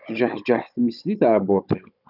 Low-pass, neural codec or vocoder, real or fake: 5.4 kHz; codec, 16 kHz, 16 kbps, FunCodec, trained on Chinese and English, 50 frames a second; fake